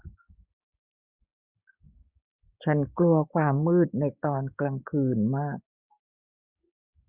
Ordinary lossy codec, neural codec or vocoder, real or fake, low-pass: Opus, 64 kbps; codec, 16 kHz, 6 kbps, DAC; fake; 3.6 kHz